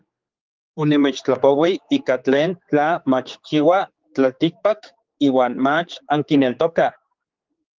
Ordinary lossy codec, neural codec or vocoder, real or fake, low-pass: Opus, 32 kbps; codec, 16 kHz, 4 kbps, X-Codec, HuBERT features, trained on general audio; fake; 7.2 kHz